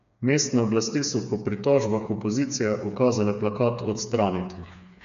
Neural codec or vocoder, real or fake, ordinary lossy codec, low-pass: codec, 16 kHz, 4 kbps, FreqCodec, smaller model; fake; none; 7.2 kHz